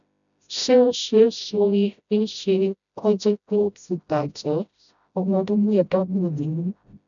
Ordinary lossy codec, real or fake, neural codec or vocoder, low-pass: none; fake; codec, 16 kHz, 0.5 kbps, FreqCodec, smaller model; 7.2 kHz